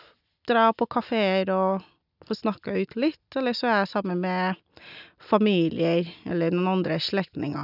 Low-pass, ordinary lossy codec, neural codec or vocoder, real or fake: 5.4 kHz; none; none; real